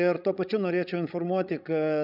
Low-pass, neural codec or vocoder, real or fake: 5.4 kHz; codec, 16 kHz, 16 kbps, FreqCodec, larger model; fake